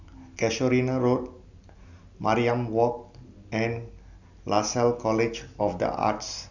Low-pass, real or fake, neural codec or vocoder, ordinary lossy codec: 7.2 kHz; real; none; none